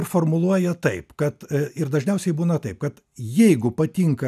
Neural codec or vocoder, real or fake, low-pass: none; real; 14.4 kHz